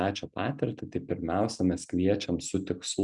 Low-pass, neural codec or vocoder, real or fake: 10.8 kHz; none; real